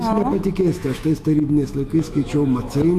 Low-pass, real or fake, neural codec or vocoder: 14.4 kHz; fake; autoencoder, 48 kHz, 128 numbers a frame, DAC-VAE, trained on Japanese speech